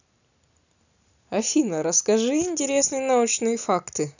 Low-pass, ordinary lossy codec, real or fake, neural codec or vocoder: 7.2 kHz; none; real; none